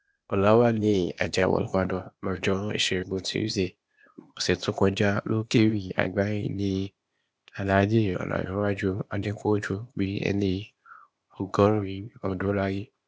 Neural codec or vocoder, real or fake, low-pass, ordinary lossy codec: codec, 16 kHz, 0.8 kbps, ZipCodec; fake; none; none